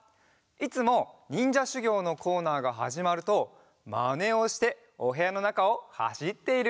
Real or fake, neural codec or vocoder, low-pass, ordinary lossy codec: real; none; none; none